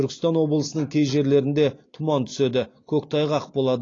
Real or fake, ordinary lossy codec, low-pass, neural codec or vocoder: real; AAC, 32 kbps; 7.2 kHz; none